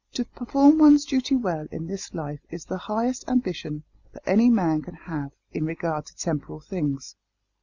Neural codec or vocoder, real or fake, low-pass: none; real; 7.2 kHz